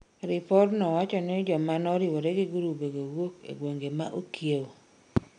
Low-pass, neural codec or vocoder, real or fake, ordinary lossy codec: 9.9 kHz; none; real; none